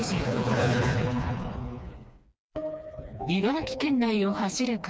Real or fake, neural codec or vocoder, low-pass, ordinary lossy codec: fake; codec, 16 kHz, 2 kbps, FreqCodec, smaller model; none; none